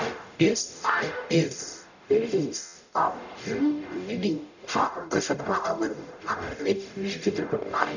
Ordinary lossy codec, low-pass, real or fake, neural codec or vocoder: none; 7.2 kHz; fake; codec, 44.1 kHz, 0.9 kbps, DAC